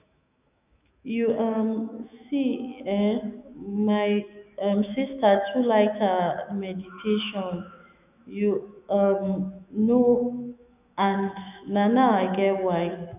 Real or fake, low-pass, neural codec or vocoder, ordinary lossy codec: fake; 3.6 kHz; autoencoder, 48 kHz, 128 numbers a frame, DAC-VAE, trained on Japanese speech; none